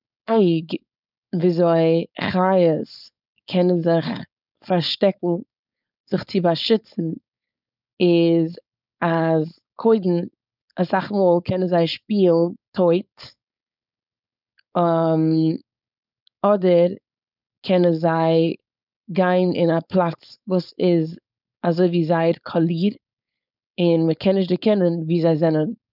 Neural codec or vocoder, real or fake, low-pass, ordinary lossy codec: codec, 16 kHz, 4.8 kbps, FACodec; fake; 5.4 kHz; none